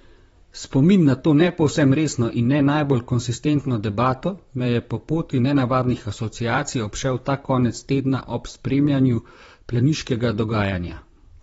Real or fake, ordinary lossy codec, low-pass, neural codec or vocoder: fake; AAC, 24 kbps; 19.8 kHz; vocoder, 44.1 kHz, 128 mel bands, Pupu-Vocoder